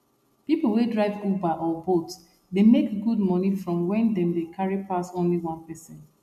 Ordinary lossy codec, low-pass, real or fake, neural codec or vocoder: MP3, 96 kbps; 14.4 kHz; real; none